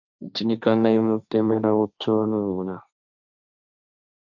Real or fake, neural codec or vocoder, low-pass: fake; codec, 16 kHz, 1.1 kbps, Voila-Tokenizer; 7.2 kHz